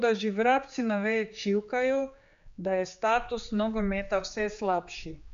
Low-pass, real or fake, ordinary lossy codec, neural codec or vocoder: 7.2 kHz; fake; AAC, 64 kbps; codec, 16 kHz, 4 kbps, X-Codec, HuBERT features, trained on general audio